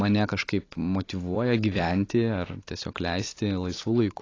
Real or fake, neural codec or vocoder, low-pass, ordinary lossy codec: fake; vocoder, 44.1 kHz, 128 mel bands every 256 samples, BigVGAN v2; 7.2 kHz; AAC, 32 kbps